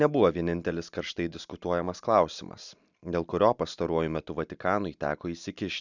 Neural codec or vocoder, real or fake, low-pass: none; real; 7.2 kHz